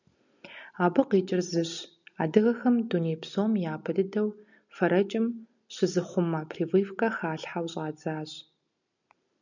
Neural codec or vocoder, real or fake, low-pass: none; real; 7.2 kHz